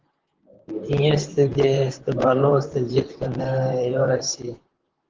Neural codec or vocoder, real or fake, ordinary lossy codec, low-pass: vocoder, 44.1 kHz, 128 mel bands, Pupu-Vocoder; fake; Opus, 16 kbps; 7.2 kHz